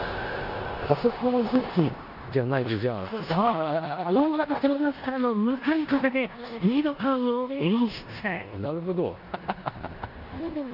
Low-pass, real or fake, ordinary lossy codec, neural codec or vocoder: 5.4 kHz; fake; none; codec, 16 kHz in and 24 kHz out, 0.9 kbps, LongCat-Audio-Codec, four codebook decoder